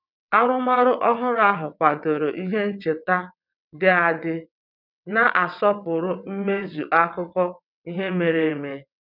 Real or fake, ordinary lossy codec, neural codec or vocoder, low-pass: fake; none; vocoder, 22.05 kHz, 80 mel bands, WaveNeXt; 5.4 kHz